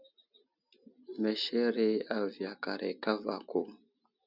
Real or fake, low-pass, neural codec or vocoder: real; 5.4 kHz; none